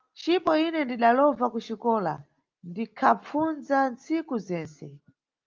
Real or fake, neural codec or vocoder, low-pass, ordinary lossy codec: real; none; 7.2 kHz; Opus, 24 kbps